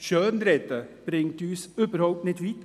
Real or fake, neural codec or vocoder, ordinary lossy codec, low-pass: real; none; none; 14.4 kHz